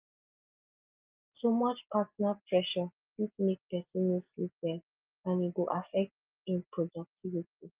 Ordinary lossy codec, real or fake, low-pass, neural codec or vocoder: Opus, 32 kbps; real; 3.6 kHz; none